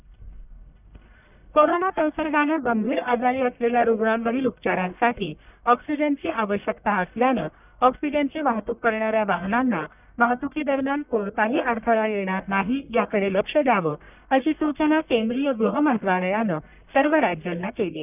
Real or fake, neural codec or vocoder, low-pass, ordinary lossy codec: fake; codec, 44.1 kHz, 1.7 kbps, Pupu-Codec; 3.6 kHz; none